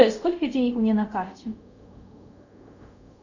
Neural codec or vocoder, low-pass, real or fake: codec, 24 kHz, 0.5 kbps, DualCodec; 7.2 kHz; fake